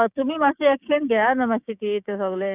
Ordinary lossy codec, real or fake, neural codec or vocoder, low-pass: none; fake; codec, 16 kHz, 6 kbps, DAC; 3.6 kHz